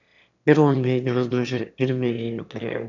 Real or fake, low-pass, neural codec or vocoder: fake; 7.2 kHz; autoencoder, 22.05 kHz, a latent of 192 numbers a frame, VITS, trained on one speaker